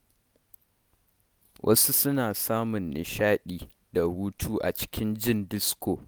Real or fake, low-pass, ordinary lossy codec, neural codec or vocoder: real; none; none; none